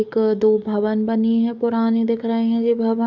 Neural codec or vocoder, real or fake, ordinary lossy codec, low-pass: codec, 44.1 kHz, 7.8 kbps, DAC; fake; none; 7.2 kHz